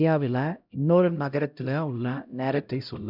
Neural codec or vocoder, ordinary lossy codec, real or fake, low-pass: codec, 16 kHz, 0.5 kbps, X-Codec, HuBERT features, trained on LibriSpeech; none; fake; 5.4 kHz